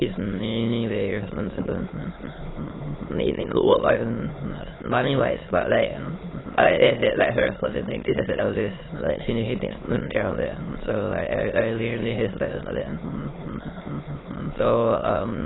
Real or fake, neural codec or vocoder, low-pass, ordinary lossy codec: fake; autoencoder, 22.05 kHz, a latent of 192 numbers a frame, VITS, trained on many speakers; 7.2 kHz; AAC, 16 kbps